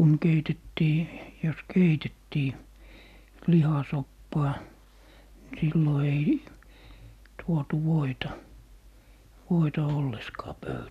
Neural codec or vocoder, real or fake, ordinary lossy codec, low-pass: none; real; none; 14.4 kHz